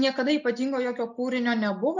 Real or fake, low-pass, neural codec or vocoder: real; 7.2 kHz; none